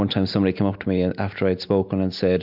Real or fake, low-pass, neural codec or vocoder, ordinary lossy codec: real; 5.4 kHz; none; MP3, 48 kbps